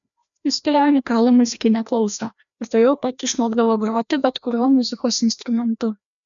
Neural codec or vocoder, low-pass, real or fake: codec, 16 kHz, 1 kbps, FreqCodec, larger model; 7.2 kHz; fake